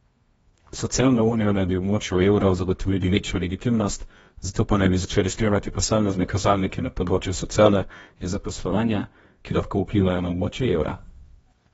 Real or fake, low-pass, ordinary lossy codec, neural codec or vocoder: fake; 10.8 kHz; AAC, 24 kbps; codec, 24 kHz, 0.9 kbps, WavTokenizer, medium music audio release